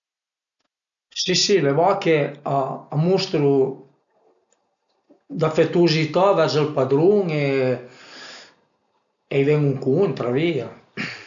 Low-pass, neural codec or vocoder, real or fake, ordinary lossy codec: 7.2 kHz; none; real; none